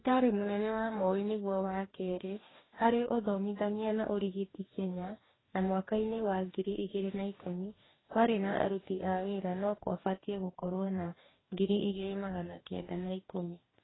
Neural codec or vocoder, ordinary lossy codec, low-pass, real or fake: codec, 44.1 kHz, 2.6 kbps, DAC; AAC, 16 kbps; 7.2 kHz; fake